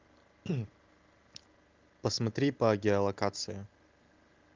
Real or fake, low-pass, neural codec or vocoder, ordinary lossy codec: real; 7.2 kHz; none; Opus, 32 kbps